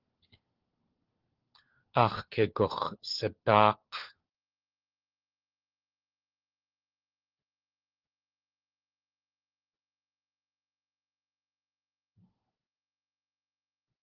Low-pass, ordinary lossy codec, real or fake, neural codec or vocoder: 5.4 kHz; Opus, 16 kbps; fake; codec, 16 kHz, 4 kbps, FunCodec, trained on LibriTTS, 50 frames a second